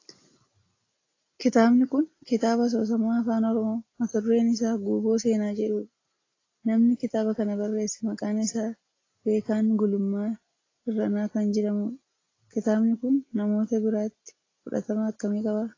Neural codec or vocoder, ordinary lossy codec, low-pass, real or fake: none; AAC, 32 kbps; 7.2 kHz; real